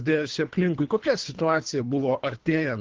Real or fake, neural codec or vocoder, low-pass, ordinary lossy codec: fake; codec, 24 kHz, 3 kbps, HILCodec; 7.2 kHz; Opus, 16 kbps